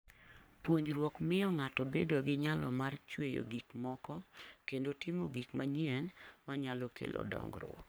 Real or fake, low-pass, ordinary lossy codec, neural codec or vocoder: fake; none; none; codec, 44.1 kHz, 3.4 kbps, Pupu-Codec